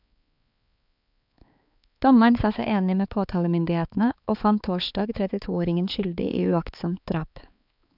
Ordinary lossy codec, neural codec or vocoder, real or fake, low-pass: none; codec, 16 kHz, 4 kbps, X-Codec, HuBERT features, trained on balanced general audio; fake; 5.4 kHz